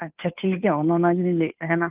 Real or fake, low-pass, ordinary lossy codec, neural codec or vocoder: fake; 3.6 kHz; Opus, 24 kbps; codec, 16 kHz, 2 kbps, FunCodec, trained on Chinese and English, 25 frames a second